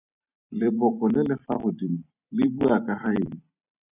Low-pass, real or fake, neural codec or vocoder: 3.6 kHz; fake; vocoder, 44.1 kHz, 128 mel bands every 256 samples, BigVGAN v2